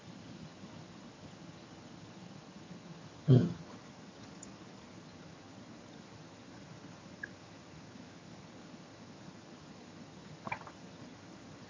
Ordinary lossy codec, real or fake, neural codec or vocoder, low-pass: MP3, 32 kbps; fake; vocoder, 22.05 kHz, 80 mel bands, HiFi-GAN; 7.2 kHz